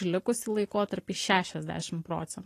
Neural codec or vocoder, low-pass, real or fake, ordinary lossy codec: vocoder, 44.1 kHz, 128 mel bands every 512 samples, BigVGAN v2; 14.4 kHz; fake; AAC, 48 kbps